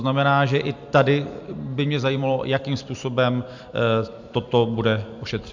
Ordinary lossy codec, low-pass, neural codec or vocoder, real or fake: MP3, 64 kbps; 7.2 kHz; none; real